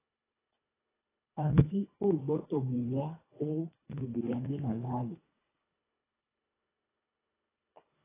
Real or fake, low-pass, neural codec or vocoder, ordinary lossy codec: fake; 3.6 kHz; codec, 24 kHz, 1.5 kbps, HILCodec; AAC, 16 kbps